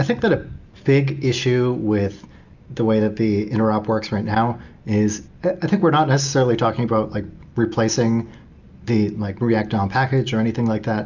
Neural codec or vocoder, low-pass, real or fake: none; 7.2 kHz; real